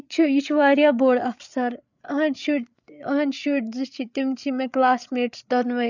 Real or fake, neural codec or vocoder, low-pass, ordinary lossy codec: fake; codec, 16 kHz, 8 kbps, FreqCodec, larger model; 7.2 kHz; none